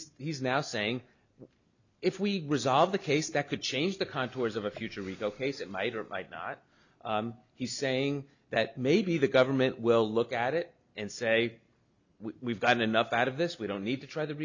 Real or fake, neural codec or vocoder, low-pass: real; none; 7.2 kHz